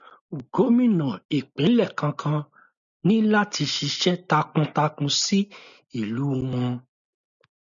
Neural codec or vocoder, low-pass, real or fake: none; 7.2 kHz; real